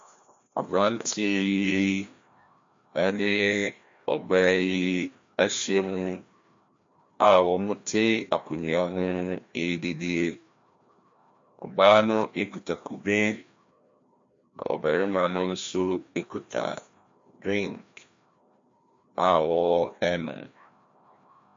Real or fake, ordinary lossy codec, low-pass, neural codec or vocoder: fake; MP3, 48 kbps; 7.2 kHz; codec, 16 kHz, 1 kbps, FreqCodec, larger model